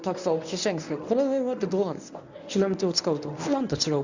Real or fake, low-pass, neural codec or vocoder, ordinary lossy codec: fake; 7.2 kHz; codec, 24 kHz, 0.9 kbps, WavTokenizer, medium speech release version 1; none